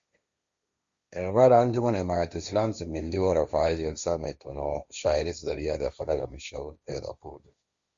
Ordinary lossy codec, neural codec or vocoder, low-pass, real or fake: Opus, 64 kbps; codec, 16 kHz, 1.1 kbps, Voila-Tokenizer; 7.2 kHz; fake